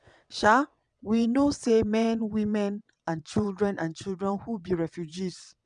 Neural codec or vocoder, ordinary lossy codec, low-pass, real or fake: vocoder, 22.05 kHz, 80 mel bands, WaveNeXt; none; 9.9 kHz; fake